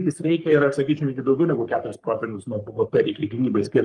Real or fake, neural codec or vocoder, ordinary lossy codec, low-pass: fake; codec, 44.1 kHz, 3.4 kbps, Pupu-Codec; Opus, 32 kbps; 10.8 kHz